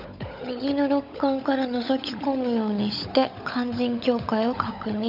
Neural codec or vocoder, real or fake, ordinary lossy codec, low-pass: codec, 16 kHz, 16 kbps, FunCodec, trained on LibriTTS, 50 frames a second; fake; none; 5.4 kHz